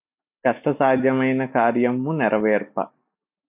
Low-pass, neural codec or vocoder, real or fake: 3.6 kHz; none; real